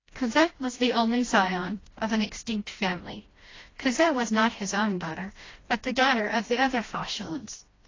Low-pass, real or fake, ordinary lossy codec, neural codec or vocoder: 7.2 kHz; fake; AAC, 32 kbps; codec, 16 kHz, 1 kbps, FreqCodec, smaller model